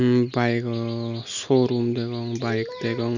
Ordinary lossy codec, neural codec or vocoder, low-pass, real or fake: Opus, 64 kbps; none; 7.2 kHz; real